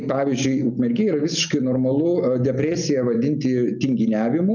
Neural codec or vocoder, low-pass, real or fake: none; 7.2 kHz; real